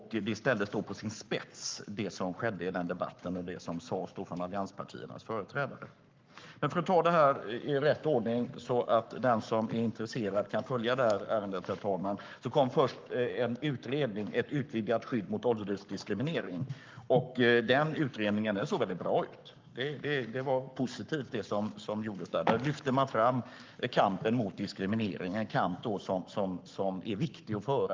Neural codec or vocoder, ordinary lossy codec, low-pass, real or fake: codec, 44.1 kHz, 7.8 kbps, Pupu-Codec; Opus, 24 kbps; 7.2 kHz; fake